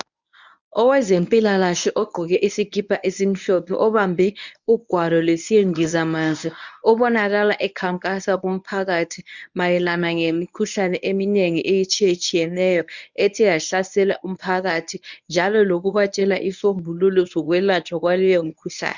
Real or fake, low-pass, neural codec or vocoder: fake; 7.2 kHz; codec, 24 kHz, 0.9 kbps, WavTokenizer, medium speech release version 1